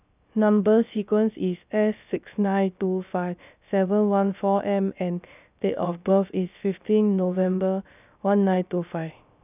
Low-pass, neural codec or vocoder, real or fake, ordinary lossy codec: 3.6 kHz; codec, 16 kHz, 0.3 kbps, FocalCodec; fake; none